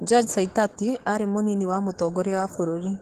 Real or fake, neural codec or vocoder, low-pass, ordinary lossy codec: fake; codec, 44.1 kHz, 7.8 kbps, DAC; 14.4 kHz; Opus, 16 kbps